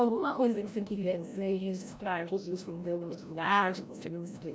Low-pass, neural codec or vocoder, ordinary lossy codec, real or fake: none; codec, 16 kHz, 0.5 kbps, FreqCodec, larger model; none; fake